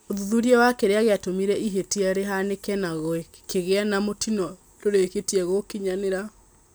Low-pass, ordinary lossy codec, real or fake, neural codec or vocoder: none; none; real; none